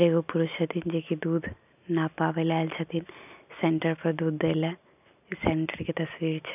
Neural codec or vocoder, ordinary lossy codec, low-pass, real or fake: none; none; 3.6 kHz; real